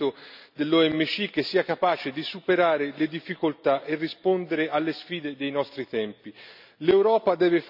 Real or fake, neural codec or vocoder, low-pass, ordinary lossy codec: real; none; 5.4 kHz; none